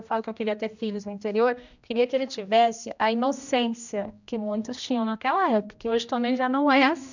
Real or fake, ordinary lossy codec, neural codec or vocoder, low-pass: fake; none; codec, 16 kHz, 1 kbps, X-Codec, HuBERT features, trained on general audio; 7.2 kHz